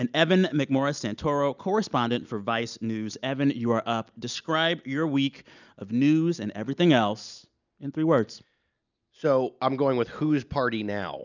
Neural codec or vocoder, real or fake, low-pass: none; real; 7.2 kHz